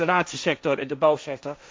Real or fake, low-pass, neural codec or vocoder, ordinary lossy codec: fake; none; codec, 16 kHz, 1.1 kbps, Voila-Tokenizer; none